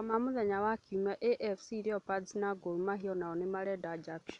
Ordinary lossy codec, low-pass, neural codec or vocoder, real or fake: none; 10.8 kHz; none; real